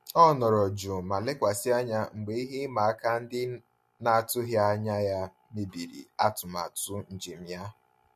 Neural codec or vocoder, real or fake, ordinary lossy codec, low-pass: none; real; MP3, 64 kbps; 14.4 kHz